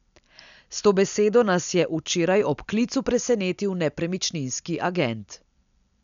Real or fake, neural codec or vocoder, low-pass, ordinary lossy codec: real; none; 7.2 kHz; none